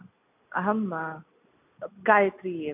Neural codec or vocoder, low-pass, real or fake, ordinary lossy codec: none; 3.6 kHz; real; AAC, 32 kbps